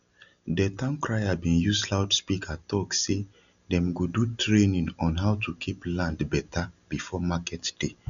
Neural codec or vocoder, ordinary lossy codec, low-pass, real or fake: none; none; 7.2 kHz; real